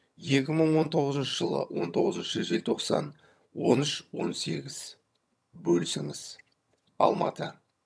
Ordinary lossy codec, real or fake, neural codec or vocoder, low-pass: none; fake; vocoder, 22.05 kHz, 80 mel bands, HiFi-GAN; none